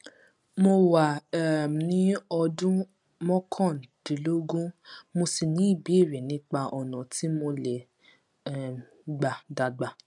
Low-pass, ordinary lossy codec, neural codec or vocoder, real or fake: 10.8 kHz; none; none; real